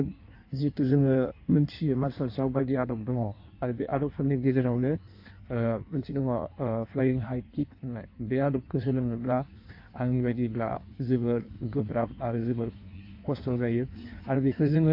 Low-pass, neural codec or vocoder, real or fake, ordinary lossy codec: 5.4 kHz; codec, 16 kHz in and 24 kHz out, 1.1 kbps, FireRedTTS-2 codec; fake; AAC, 32 kbps